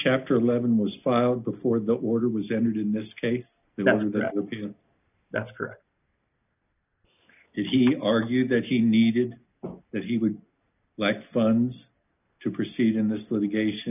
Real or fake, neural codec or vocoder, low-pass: real; none; 3.6 kHz